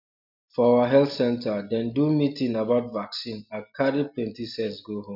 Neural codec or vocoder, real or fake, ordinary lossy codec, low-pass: none; real; none; 5.4 kHz